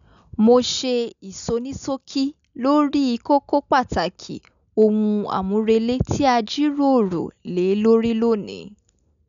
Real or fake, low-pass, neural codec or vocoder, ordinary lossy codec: real; 7.2 kHz; none; none